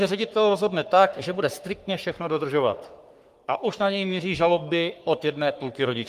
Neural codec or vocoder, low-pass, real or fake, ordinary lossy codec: codec, 44.1 kHz, 3.4 kbps, Pupu-Codec; 14.4 kHz; fake; Opus, 32 kbps